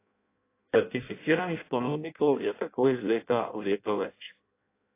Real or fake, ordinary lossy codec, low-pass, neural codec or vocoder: fake; AAC, 24 kbps; 3.6 kHz; codec, 16 kHz in and 24 kHz out, 0.6 kbps, FireRedTTS-2 codec